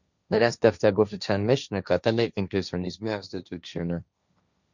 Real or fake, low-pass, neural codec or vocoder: fake; 7.2 kHz; codec, 16 kHz, 1.1 kbps, Voila-Tokenizer